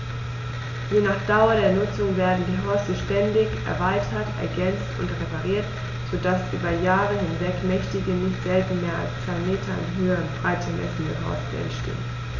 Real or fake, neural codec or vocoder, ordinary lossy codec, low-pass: real; none; none; 7.2 kHz